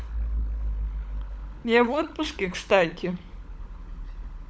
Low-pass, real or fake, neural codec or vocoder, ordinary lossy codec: none; fake; codec, 16 kHz, 16 kbps, FunCodec, trained on LibriTTS, 50 frames a second; none